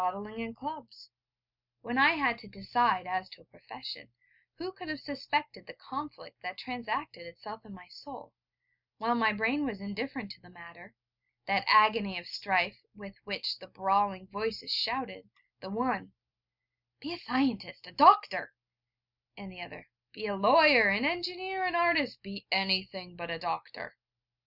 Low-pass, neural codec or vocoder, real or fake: 5.4 kHz; none; real